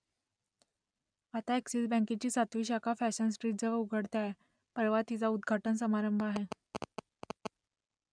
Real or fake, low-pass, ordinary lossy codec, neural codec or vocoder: real; 9.9 kHz; none; none